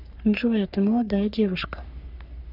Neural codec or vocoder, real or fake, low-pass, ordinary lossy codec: codec, 44.1 kHz, 3.4 kbps, Pupu-Codec; fake; 5.4 kHz; none